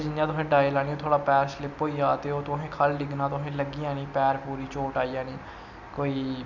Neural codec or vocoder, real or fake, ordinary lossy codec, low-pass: none; real; none; 7.2 kHz